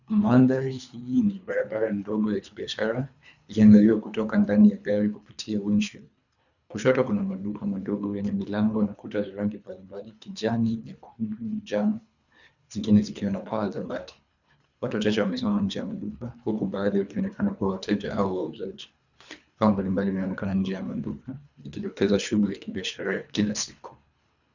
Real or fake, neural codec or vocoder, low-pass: fake; codec, 24 kHz, 3 kbps, HILCodec; 7.2 kHz